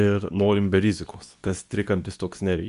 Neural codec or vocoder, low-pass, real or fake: codec, 24 kHz, 0.9 kbps, WavTokenizer, medium speech release version 2; 10.8 kHz; fake